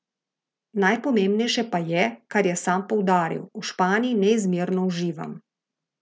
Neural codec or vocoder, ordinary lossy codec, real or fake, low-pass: none; none; real; none